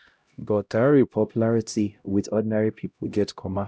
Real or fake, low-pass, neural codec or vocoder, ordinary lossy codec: fake; none; codec, 16 kHz, 0.5 kbps, X-Codec, HuBERT features, trained on LibriSpeech; none